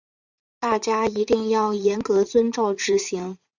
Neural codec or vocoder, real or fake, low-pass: vocoder, 44.1 kHz, 80 mel bands, Vocos; fake; 7.2 kHz